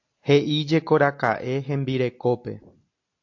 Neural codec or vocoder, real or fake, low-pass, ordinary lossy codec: none; real; 7.2 kHz; AAC, 48 kbps